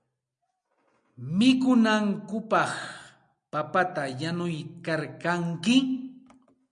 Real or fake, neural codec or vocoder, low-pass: real; none; 9.9 kHz